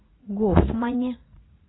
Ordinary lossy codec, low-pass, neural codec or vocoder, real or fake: AAC, 16 kbps; 7.2 kHz; vocoder, 44.1 kHz, 80 mel bands, Vocos; fake